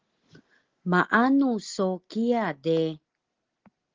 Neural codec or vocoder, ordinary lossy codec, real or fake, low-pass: none; Opus, 16 kbps; real; 7.2 kHz